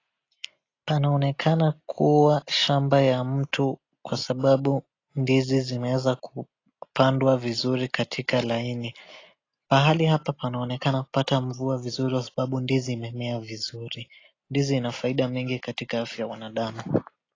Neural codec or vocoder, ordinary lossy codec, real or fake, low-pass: none; AAC, 32 kbps; real; 7.2 kHz